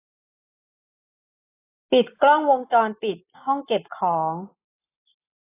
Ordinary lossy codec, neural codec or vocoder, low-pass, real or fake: AAC, 16 kbps; none; 3.6 kHz; real